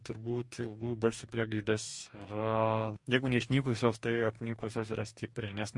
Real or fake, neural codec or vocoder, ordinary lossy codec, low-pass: fake; codec, 44.1 kHz, 2.6 kbps, DAC; MP3, 48 kbps; 10.8 kHz